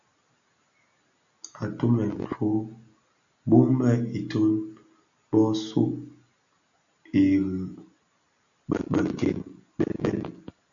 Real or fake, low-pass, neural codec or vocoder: real; 7.2 kHz; none